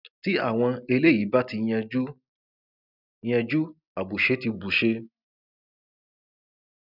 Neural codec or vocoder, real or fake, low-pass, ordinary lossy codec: none; real; 5.4 kHz; none